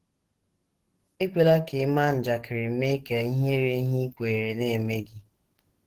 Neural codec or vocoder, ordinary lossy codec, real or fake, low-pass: codec, 44.1 kHz, 7.8 kbps, DAC; Opus, 16 kbps; fake; 14.4 kHz